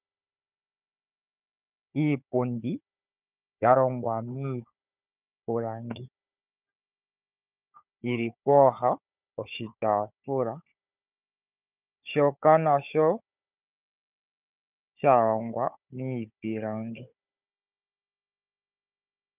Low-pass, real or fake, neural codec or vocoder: 3.6 kHz; fake; codec, 16 kHz, 4 kbps, FunCodec, trained on Chinese and English, 50 frames a second